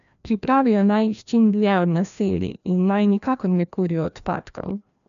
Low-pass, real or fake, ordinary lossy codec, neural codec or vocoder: 7.2 kHz; fake; none; codec, 16 kHz, 1 kbps, FreqCodec, larger model